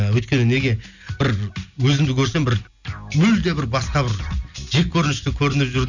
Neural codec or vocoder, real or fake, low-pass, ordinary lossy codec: none; real; 7.2 kHz; none